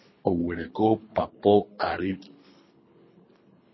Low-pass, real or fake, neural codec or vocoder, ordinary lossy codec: 7.2 kHz; fake; codec, 24 kHz, 3 kbps, HILCodec; MP3, 24 kbps